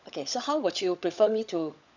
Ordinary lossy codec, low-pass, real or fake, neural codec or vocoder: none; 7.2 kHz; fake; codec, 16 kHz, 16 kbps, FunCodec, trained on LibriTTS, 50 frames a second